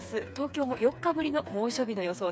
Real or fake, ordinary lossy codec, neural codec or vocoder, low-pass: fake; none; codec, 16 kHz, 4 kbps, FreqCodec, smaller model; none